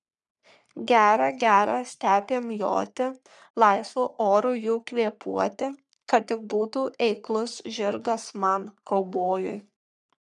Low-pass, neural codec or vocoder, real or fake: 10.8 kHz; codec, 44.1 kHz, 3.4 kbps, Pupu-Codec; fake